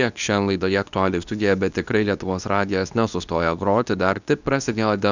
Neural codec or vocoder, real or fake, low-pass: codec, 24 kHz, 0.9 kbps, WavTokenizer, medium speech release version 2; fake; 7.2 kHz